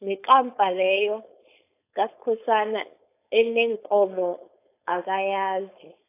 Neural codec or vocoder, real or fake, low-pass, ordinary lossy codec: codec, 16 kHz, 4.8 kbps, FACodec; fake; 3.6 kHz; AAC, 24 kbps